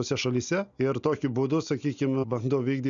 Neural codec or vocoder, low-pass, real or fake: none; 7.2 kHz; real